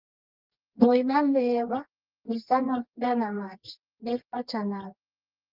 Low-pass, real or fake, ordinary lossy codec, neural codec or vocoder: 5.4 kHz; fake; Opus, 32 kbps; codec, 24 kHz, 0.9 kbps, WavTokenizer, medium music audio release